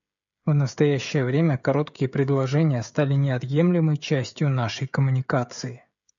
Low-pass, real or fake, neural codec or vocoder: 7.2 kHz; fake; codec, 16 kHz, 16 kbps, FreqCodec, smaller model